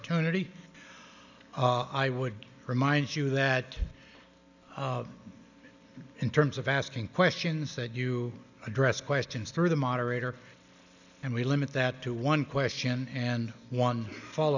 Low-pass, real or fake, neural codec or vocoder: 7.2 kHz; real; none